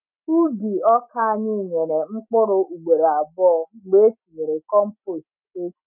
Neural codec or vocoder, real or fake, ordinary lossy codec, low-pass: none; real; none; 3.6 kHz